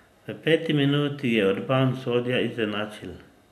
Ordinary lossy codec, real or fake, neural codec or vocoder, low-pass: none; real; none; 14.4 kHz